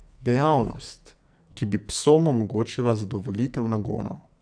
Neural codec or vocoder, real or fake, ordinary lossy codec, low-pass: codec, 44.1 kHz, 2.6 kbps, SNAC; fake; none; 9.9 kHz